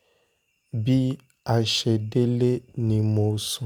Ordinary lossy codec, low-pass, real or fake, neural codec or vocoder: none; none; real; none